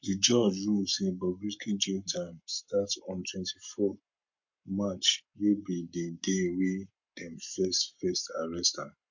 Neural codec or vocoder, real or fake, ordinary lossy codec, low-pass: codec, 44.1 kHz, 7.8 kbps, Pupu-Codec; fake; MP3, 48 kbps; 7.2 kHz